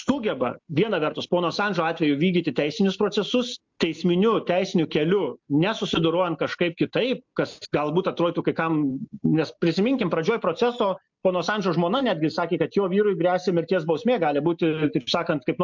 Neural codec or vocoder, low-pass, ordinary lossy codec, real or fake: none; 7.2 kHz; MP3, 64 kbps; real